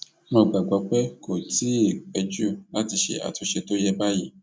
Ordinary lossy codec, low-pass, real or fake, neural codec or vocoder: none; none; real; none